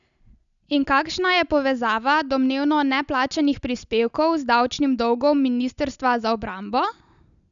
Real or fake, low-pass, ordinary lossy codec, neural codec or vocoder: real; 7.2 kHz; none; none